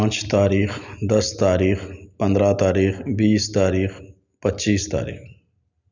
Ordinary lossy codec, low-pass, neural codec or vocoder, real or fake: none; 7.2 kHz; none; real